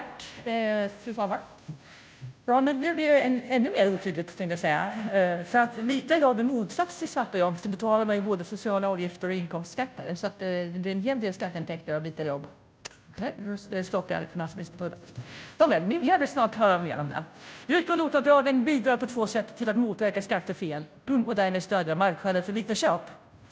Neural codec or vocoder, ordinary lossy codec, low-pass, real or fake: codec, 16 kHz, 0.5 kbps, FunCodec, trained on Chinese and English, 25 frames a second; none; none; fake